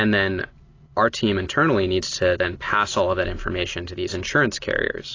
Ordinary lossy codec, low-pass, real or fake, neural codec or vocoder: AAC, 32 kbps; 7.2 kHz; real; none